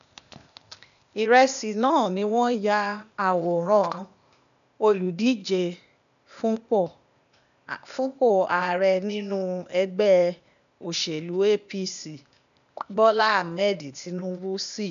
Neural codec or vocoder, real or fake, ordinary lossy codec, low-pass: codec, 16 kHz, 0.8 kbps, ZipCodec; fake; none; 7.2 kHz